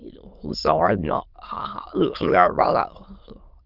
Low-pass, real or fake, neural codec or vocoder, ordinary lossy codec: 5.4 kHz; fake; autoencoder, 22.05 kHz, a latent of 192 numbers a frame, VITS, trained on many speakers; Opus, 32 kbps